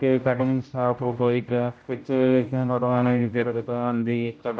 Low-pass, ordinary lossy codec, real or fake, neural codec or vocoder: none; none; fake; codec, 16 kHz, 0.5 kbps, X-Codec, HuBERT features, trained on general audio